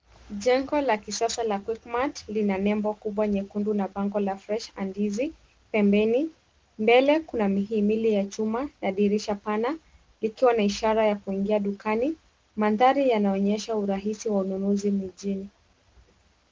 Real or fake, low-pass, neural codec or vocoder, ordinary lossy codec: real; 7.2 kHz; none; Opus, 16 kbps